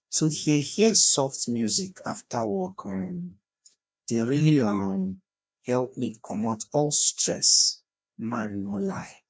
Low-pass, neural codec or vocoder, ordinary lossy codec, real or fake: none; codec, 16 kHz, 1 kbps, FreqCodec, larger model; none; fake